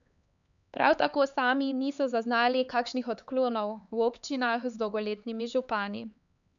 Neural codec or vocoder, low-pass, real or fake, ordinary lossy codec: codec, 16 kHz, 4 kbps, X-Codec, HuBERT features, trained on LibriSpeech; 7.2 kHz; fake; none